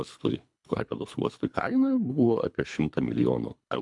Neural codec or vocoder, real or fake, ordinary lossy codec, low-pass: codec, 24 kHz, 3 kbps, HILCodec; fake; MP3, 96 kbps; 10.8 kHz